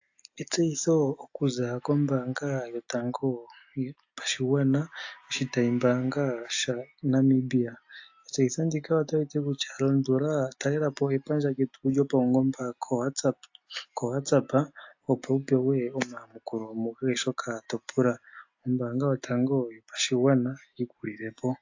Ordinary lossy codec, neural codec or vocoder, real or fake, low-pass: AAC, 48 kbps; none; real; 7.2 kHz